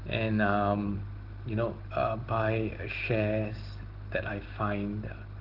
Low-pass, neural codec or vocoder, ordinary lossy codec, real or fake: 5.4 kHz; none; Opus, 16 kbps; real